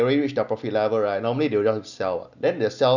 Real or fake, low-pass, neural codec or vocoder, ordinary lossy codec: real; 7.2 kHz; none; none